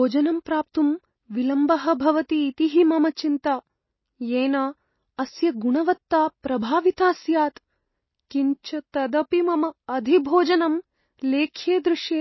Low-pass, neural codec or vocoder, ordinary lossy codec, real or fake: 7.2 kHz; none; MP3, 24 kbps; real